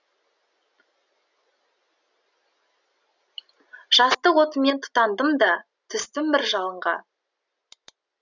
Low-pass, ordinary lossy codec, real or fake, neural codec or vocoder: 7.2 kHz; none; real; none